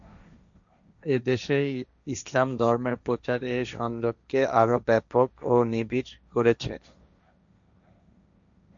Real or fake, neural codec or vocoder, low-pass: fake; codec, 16 kHz, 1.1 kbps, Voila-Tokenizer; 7.2 kHz